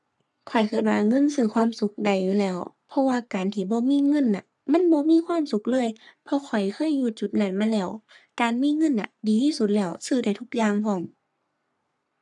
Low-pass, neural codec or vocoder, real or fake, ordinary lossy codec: 10.8 kHz; codec, 44.1 kHz, 3.4 kbps, Pupu-Codec; fake; none